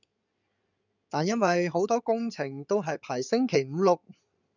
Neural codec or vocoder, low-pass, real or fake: codec, 16 kHz in and 24 kHz out, 2.2 kbps, FireRedTTS-2 codec; 7.2 kHz; fake